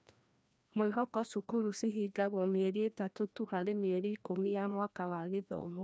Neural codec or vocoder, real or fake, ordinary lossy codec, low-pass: codec, 16 kHz, 1 kbps, FreqCodec, larger model; fake; none; none